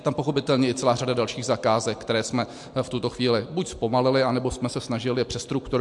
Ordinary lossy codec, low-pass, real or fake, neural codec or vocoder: MP3, 64 kbps; 10.8 kHz; real; none